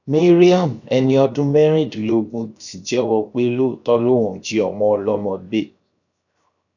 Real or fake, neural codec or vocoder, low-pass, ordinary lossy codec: fake; codec, 16 kHz, 0.7 kbps, FocalCodec; 7.2 kHz; none